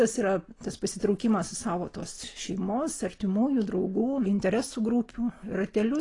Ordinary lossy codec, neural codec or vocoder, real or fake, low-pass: AAC, 32 kbps; none; real; 10.8 kHz